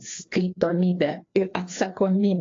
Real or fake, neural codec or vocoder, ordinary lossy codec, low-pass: fake; codec, 16 kHz, 2 kbps, FreqCodec, larger model; AAC, 32 kbps; 7.2 kHz